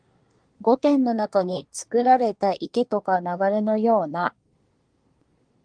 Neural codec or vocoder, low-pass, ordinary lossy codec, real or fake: codec, 24 kHz, 1 kbps, SNAC; 9.9 kHz; Opus, 24 kbps; fake